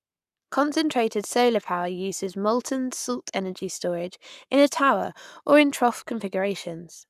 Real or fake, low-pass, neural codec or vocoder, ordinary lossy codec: fake; 14.4 kHz; codec, 44.1 kHz, 7.8 kbps, Pupu-Codec; none